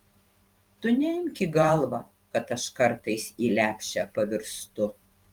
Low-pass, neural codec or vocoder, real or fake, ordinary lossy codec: 19.8 kHz; vocoder, 44.1 kHz, 128 mel bands every 512 samples, BigVGAN v2; fake; Opus, 32 kbps